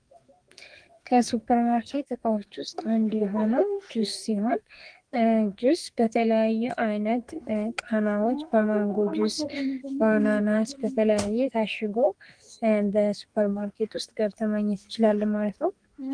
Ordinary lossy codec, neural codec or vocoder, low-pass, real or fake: Opus, 24 kbps; codec, 32 kHz, 1.9 kbps, SNAC; 9.9 kHz; fake